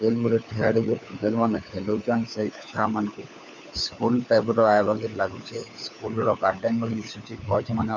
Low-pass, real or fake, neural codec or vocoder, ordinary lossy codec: 7.2 kHz; fake; codec, 16 kHz, 4 kbps, FunCodec, trained on LibriTTS, 50 frames a second; MP3, 64 kbps